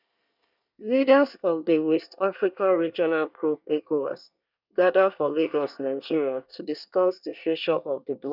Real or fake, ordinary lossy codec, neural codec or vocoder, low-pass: fake; none; codec, 24 kHz, 1 kbps, SNAC; 5.4 kHz